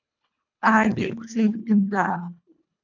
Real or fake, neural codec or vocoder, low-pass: fake; codec, 24 kHz, 1.5 kbps, HILCodec; 7.2 kHz